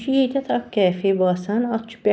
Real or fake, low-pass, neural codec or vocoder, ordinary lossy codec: real; none; none; none